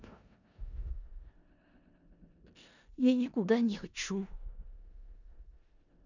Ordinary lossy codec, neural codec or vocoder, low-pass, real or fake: none; codec, 16 kHz in and 24 kHz out, 0.4 kbps, LongCat-Audio-Codec, four codebook decoder; 7.2 kHz; fake